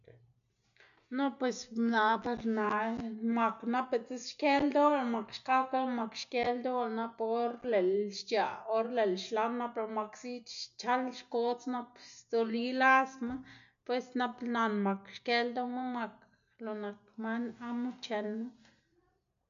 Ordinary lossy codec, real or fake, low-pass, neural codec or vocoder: none; real; 7.2 kHz; none